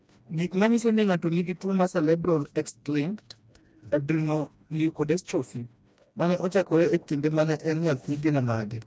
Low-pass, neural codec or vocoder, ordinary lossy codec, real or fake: none; codec, 16 kHz, 1 kbps, FreqCodec, smaller model; none; fake